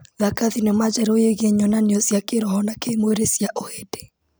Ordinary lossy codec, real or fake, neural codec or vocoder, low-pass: none; real; none; none